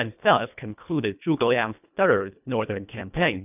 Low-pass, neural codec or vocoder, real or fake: 3.6 kHz; codec, 24 kHz, 1.5 kbps, HILCodec; fake